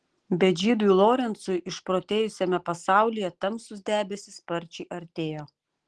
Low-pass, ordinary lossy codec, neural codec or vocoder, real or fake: 10.8 kHz; Opus, 16 kbps; none; real